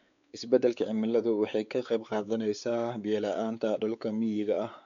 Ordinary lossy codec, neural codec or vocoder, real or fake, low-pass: none; codec, 16 kHz, 4 kbps, X-Codec, WavLM features, trained on Multilingual LibriSpeech; fake; 7.2 kHz